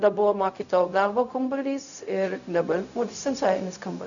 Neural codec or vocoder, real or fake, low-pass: codec, 16 kHz, 0.4 kbps, LongCat-Audio-Codec; fake; 7.2 kHz